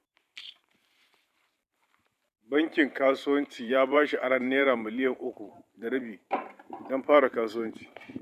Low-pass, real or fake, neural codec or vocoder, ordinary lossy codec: 14.4 kHz; fake; vocoder, 44.1 kHz, 128 mel bands every 512 samples, BigVGAN v2; none